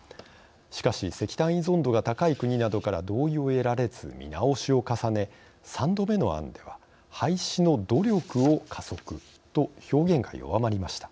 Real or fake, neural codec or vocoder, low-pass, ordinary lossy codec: real; none; none; none